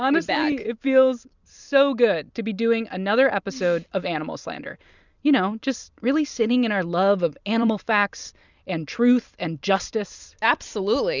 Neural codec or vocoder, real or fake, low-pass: vocoder, 44.1 kHz, 128 mel bands every 256 samples, BigVGAN v2; fake; 7.2 kHz